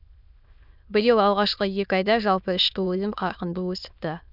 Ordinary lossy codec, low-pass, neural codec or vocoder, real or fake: none; 5.4 kHz; autoencoder, 22.05 kHz, a latent of 192 numbers a frame, VITS, trained on many speakers; fake